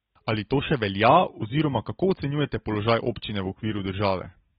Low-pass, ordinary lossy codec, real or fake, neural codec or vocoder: 10.8 kHz; AAC, 16 kbps; real; none